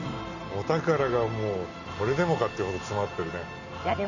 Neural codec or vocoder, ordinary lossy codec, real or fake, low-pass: none; none; real; 7.2 kHz